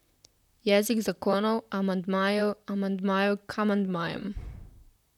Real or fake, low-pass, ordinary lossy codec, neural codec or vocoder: fake; 19.8 kHz; none; vocoder, 44.1 kHz, 128 mel bands, Pupu-Vocoder